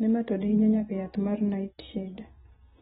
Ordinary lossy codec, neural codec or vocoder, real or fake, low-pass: AAC, 16 kbps; none; real; 7.2 kHz